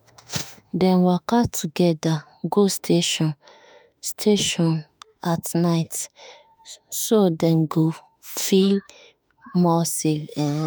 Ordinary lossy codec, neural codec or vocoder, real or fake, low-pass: none; autoencoder, 48 kHz, 32 numbers a frame, DAC-VAE, trained on Japanese speech; fake; none